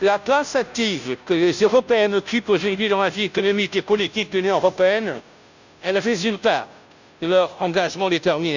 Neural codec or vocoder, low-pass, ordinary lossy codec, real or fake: codec, 16 kHz, 0.5 kbps, FunCodec, trained on Chinese and English, 25 frames a second; 7.2 kHz; none; fake